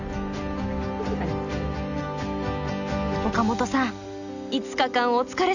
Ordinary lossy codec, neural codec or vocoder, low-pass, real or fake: none; none; 7.2 kHz; real